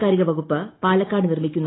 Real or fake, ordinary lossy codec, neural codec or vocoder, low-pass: real; AAC, 16 kbps; none; 7.2 kHz